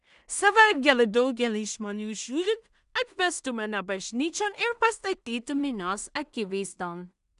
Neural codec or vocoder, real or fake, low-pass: codec, 16 kHz in and 24 kHz out, 0.4 kbps, LongCat-Audio-Codec, two codebook decoder; fake; 10.8 kHz